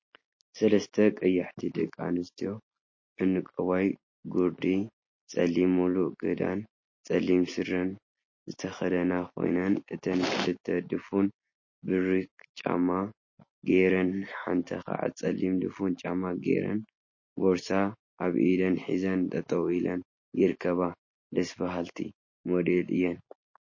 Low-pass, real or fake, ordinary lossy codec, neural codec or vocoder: 7.2 kHz; real; MP3, 32 kbps; none